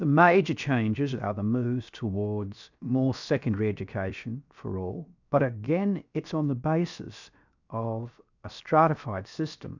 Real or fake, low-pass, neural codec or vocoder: fake; 7.2 kHz; codec, 16 kHz, 0.7 kbps, FocalCodec